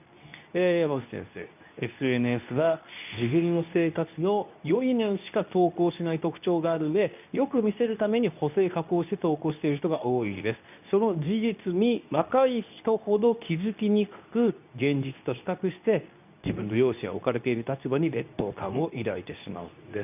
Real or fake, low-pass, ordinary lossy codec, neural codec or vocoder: fake; 3.6 kHz; none; codec, 24 kHz, 0.9 kbps, WavTokenizer, medium speech release version 2